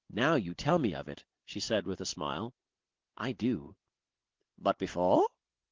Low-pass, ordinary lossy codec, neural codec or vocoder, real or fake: 7.2 kHz; Opus, 16 kbps; none; real